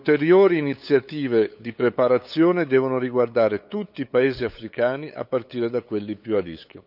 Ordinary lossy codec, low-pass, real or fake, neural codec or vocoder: none; 5.4 kHz; fake; codec, 16 kHz, 8 kbps, FunCodec, trained on LibriTTS, 25 frames a second